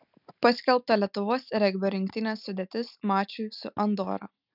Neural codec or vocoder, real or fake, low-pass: none; real; 5.4 kHz